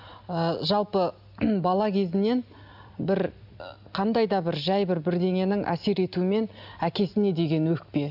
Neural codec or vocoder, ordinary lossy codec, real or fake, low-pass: none; none; real; 5.4 kHz